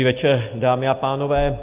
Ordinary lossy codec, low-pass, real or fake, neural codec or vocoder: Opus, 32 kbps; 3.6 kHz; real; none